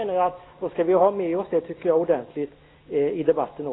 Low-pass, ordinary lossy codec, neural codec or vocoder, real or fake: 7.2 kHz; AAC, 16 kbps; none; real